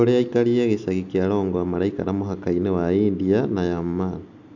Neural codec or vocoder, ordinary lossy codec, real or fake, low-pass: none; none; real; 7.2 kHz